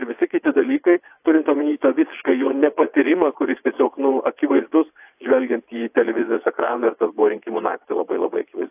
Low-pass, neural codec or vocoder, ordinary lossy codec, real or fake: 3.6 kHz; vocoder, 22.05 kHz, 80 mel bands, WaveNeXt; AAC, 32 kbps; fake